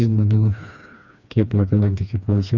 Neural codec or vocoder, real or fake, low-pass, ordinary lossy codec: codec, 16 kHz, 2 kbps, FreqCodec, smaller model; fake; 7.2 kHz; none